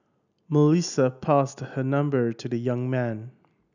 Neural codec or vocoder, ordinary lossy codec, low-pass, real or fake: none; none; 7.2 kHz; real